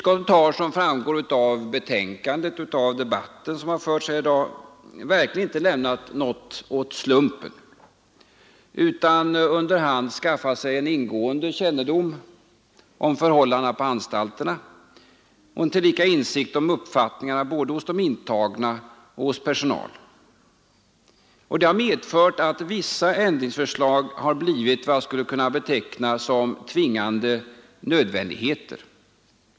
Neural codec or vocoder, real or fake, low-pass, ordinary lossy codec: none; real; none; none